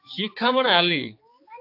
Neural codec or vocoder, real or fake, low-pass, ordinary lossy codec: codec, 16 kHz, 4 kbps, X-Codec, HuBERT features, trained on balanced general audio; fake; 5.4 kHz; AAC, 32 kbps